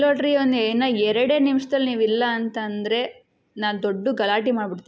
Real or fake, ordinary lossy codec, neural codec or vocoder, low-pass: real; none; none; none